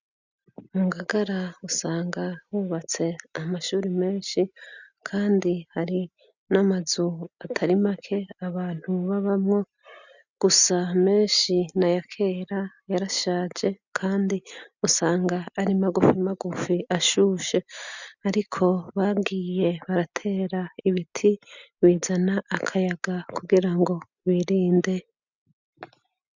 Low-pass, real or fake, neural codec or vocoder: 7.2 kHz; real; none